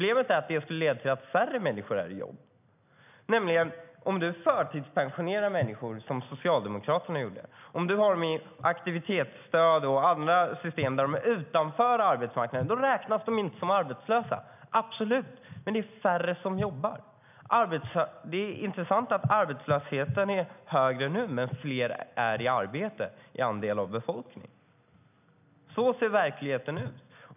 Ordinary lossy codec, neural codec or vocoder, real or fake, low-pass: none; none; real; 3.6 kHz